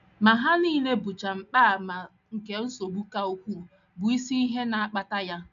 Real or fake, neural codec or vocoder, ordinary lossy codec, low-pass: real; none; none; 7.2 kHz